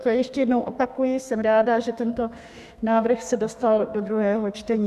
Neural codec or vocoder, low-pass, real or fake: codec, 32 kHz, 1.9 kbps, SNAC; 14.4 kHz; fake